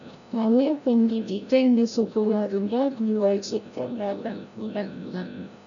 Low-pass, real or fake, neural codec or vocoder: 7.2 kHz; fake; codec, 16 kHz, 0.5 kbps, FreqCodec, larger model